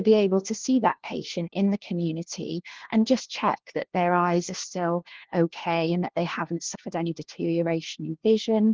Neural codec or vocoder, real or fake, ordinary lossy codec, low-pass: codec, 16 kHz, 1 kbps, FunCodec, trained on LibriTTS, 50 frames a second; fake; Opus, 16 kbps; 7.2 kHz